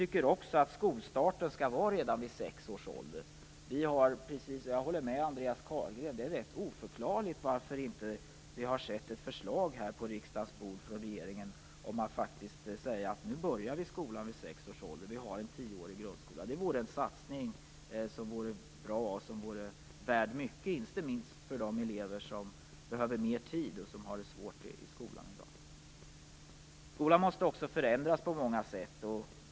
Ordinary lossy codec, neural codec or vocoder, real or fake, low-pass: none; none; real; none